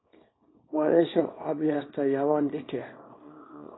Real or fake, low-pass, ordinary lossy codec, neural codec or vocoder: fake; 7.2 kHz; AAC, 16 kbps; codec, 24 kHz, 0.9 kbps, WavTokenizer, small release